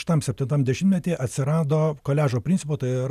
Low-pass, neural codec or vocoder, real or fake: 14.4 kHz; none; real